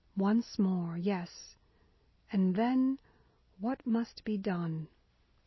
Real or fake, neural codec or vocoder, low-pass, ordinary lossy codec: real; none; 7.2 kHz; MP3, 24 kbps